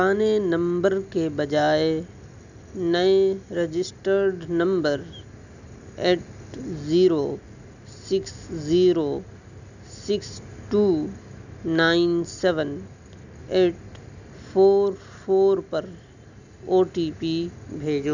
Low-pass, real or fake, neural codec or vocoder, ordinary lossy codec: 7.2 kHz; real; none; none